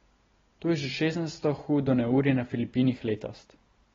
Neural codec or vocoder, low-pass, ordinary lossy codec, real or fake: none; 7.2 kHz; AAC, 24 kbps; real